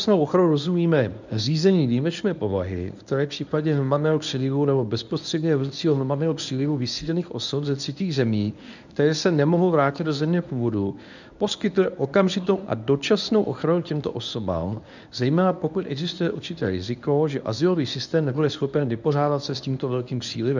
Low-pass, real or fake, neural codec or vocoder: 7.2 kHz; fake; codec, 24 kHz, 0.9 kbps, WavTokenizer, medium speech release version 1